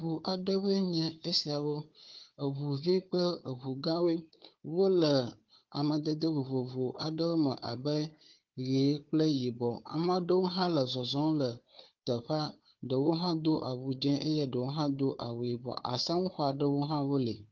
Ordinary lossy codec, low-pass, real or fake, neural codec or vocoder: Opus, 32 kbps; 7.2 kHz; fake; codec, 16 kHz, 4 kbps, FunCodec, trained on Chinese and English, 50 frames a second